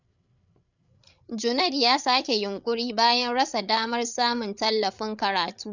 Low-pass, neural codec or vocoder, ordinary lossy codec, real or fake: 7.2 kHz; codec, 16 kHz, 8 kbps, FreqCodec, larger model; none; fake